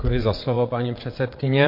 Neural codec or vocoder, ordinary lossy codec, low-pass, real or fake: vocoder, 22.05 kHz, 80 mel bands, WaveNeXt; MP3, 32 kbps; 5.4 kHz; fake